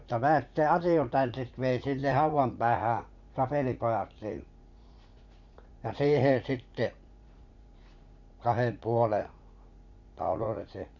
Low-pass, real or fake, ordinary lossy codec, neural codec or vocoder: 7.2 kHz; fake; none; vocoder, 44.1 kHz, 80 mel bands, Vocos